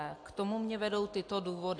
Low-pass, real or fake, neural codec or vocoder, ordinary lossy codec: 9.9 kHz; real; none; AAC, 48 kbps